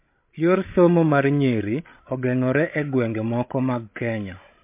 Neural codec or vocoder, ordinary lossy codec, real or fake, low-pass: codec, 16 kHz, 16 kbps, FreqCodec, larger model; MP3, 24 kbps; fake; 3.6 kHz